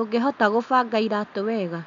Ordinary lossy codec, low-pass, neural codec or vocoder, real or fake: MP3, 64 kbps; 7.2 kHz; none; real